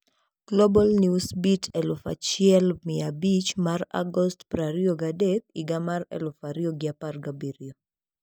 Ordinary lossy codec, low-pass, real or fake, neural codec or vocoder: none; none; real; none